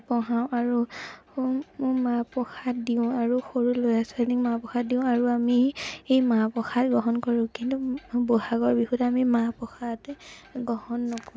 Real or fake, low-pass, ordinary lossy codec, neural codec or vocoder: real; none; none; none